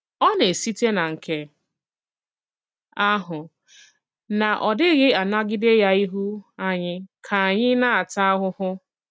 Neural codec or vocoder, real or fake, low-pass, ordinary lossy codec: none; real; none; none